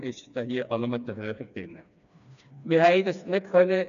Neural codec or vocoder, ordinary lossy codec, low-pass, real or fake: codec, 16 kHz, 2 kbps, FreqCodec, smaller model; none; 7.2 kHz; fake